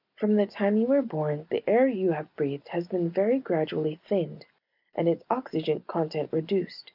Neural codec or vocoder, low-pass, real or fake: none; 5.4 kHz; real